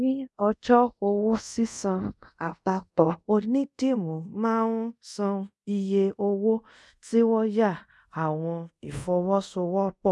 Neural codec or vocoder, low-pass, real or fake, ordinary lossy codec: codec, 24 kHz, 0.5 kbps, DualCodec; none; fake; none